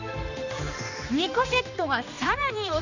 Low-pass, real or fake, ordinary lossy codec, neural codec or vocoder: 7.2 kHz; fake; none; codec, 16 kHz, 2 kbps, X-Codec, HuBERT features, trained on general audio